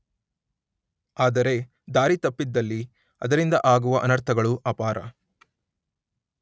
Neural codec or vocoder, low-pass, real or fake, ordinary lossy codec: none; none; real; none